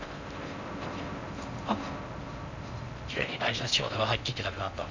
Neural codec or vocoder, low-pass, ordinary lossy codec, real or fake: codec, 16 kHz in and 24 kHz out, 0.6 kbps, FocalCodec, streaming, 4096 codes; 7.2 kHz; MP3, 64 kbps; fake